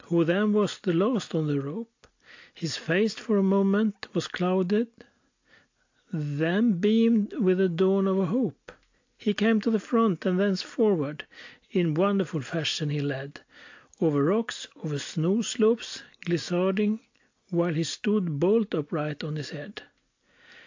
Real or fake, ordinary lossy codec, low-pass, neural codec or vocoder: real; AAC, 48 kbps; 7.2 kHz; none